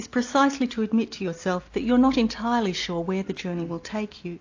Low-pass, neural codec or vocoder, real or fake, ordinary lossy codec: 7.2 kHz; none; real; AAC, 48 kbps